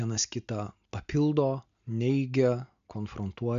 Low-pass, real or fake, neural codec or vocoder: 7.2 kHz; real; none